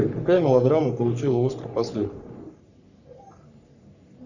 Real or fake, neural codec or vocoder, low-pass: fake; codec, 44.1 kHz, 3.4 kbps, Pupu-Codec; 7.2 kHz